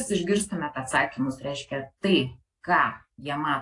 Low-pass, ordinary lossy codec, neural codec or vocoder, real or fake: 10.8 kHz; AAC, 48 kbps; none; real